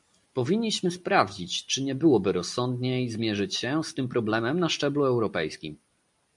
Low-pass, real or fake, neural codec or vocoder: 10.8 kHz; real; none